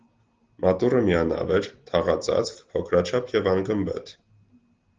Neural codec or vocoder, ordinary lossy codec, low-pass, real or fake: none; Opus, 16 kbps; 7.2 kHz; real